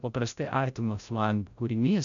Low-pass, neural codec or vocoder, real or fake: 7.2 kHz; codec, 16 kHz, 0.5 kbps, FreqCodec, larger model; fake